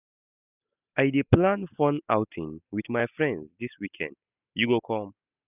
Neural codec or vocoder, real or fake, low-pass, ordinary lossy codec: none; real; 3.6 kHz; none